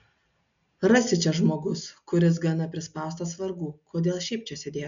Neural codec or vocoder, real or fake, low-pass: none; real; 7.2 kHz